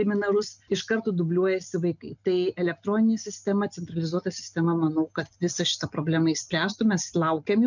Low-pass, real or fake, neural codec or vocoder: 7.2 kHz; real; none